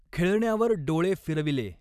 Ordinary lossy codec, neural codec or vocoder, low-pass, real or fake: none; vocoder, 44.1 kHz, 128 mel bands every 256 samples, BigVGAN v2; 14.4 kHz; fake